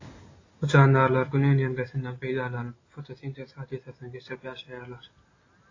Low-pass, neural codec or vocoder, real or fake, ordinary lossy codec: 7.2 kHz; none; real; AAC, 32 kbps